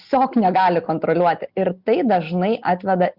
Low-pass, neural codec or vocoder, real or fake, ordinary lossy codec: 5.4 kHz; none; real; Opus, 64 kbps